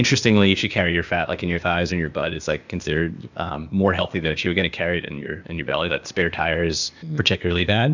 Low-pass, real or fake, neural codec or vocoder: 7.2 kHz; fake; codec, 16 kHz, 0.8 kbps, ZipCodec